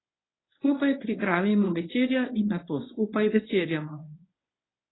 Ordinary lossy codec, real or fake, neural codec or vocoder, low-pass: AAC, 16 kbps; fake; codec, 24 kHz, 0.9 kbps, WavTokenizer, medium speech release version 1; 7.2 kHz